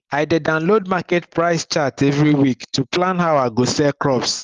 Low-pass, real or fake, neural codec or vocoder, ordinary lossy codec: 7.2 kHz; real; none; Opus, 32 kbps